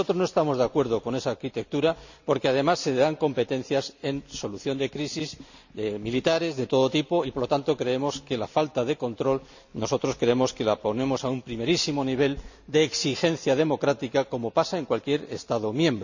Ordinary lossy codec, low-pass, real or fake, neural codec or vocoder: none; 7.2 kHz; real; none